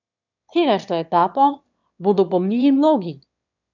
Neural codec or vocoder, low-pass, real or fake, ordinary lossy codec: autoencoder, 22.05 kHz, a latent of 192 numbers a frame, VITS, trained on one speaker; 7.2 kHz; fake; none